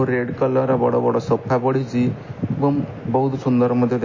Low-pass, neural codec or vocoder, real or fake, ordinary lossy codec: 7.2 kHz; none; real; MP3, 32 kbps